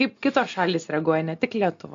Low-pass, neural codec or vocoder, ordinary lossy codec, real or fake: 7.2 kHz; none; MP3, 48 kbps; real